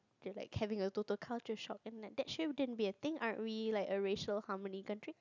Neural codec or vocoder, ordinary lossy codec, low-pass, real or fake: none; none; 7.2 kHz; real